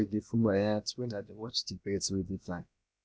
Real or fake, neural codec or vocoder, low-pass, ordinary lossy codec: fake; codec, 16 kHz, about 1 kbps, DyCAST, with the encoder's durations; none; none